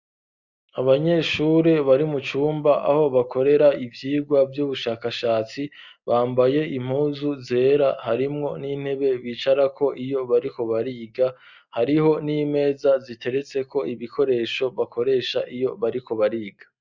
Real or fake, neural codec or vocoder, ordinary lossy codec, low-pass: real; none; Opus, 64 kbps; 7.2 kHz